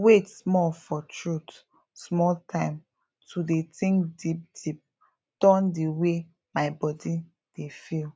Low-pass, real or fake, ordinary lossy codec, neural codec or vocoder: none; real; none; none